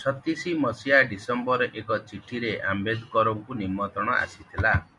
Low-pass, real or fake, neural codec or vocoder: 10.8 kHz; real; none